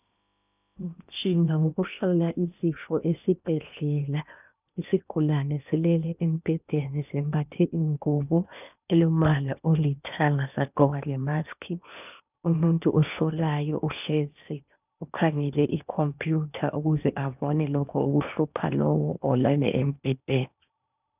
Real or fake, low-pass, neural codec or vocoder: fake; 3.6 kHz; codec, 16 kHz in and 24 kHz out, 0.8 kbps, FocalCodec, streaming, 65536 codes